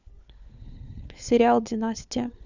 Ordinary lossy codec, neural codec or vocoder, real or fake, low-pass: none; codec, 16 kHz, 4 kbps, FunCodec, trained on LibriTTS, 50 frames a second; fake; 7.2 kHz